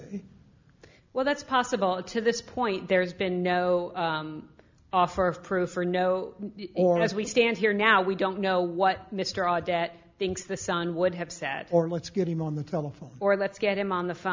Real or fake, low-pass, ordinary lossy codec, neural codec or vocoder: real; 7.2 kHz; MP3, 64 kbps; none